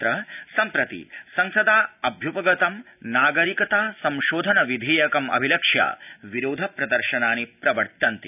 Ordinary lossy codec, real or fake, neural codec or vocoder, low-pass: none; real; none; 3.6 kHz